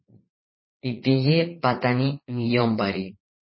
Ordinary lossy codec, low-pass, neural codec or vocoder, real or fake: MP3, 24 kbps; 7.2 kHz; vocoder, 22.05 kHz, 80 mel bands, Vocos; fake